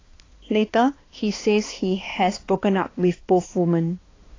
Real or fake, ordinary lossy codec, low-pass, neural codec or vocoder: fake; AAC, 32 kbps; 7.2 kHz; codec, 16 kHz, 2 kbps, X-Codec, HuBERT features, trained on balanced general audio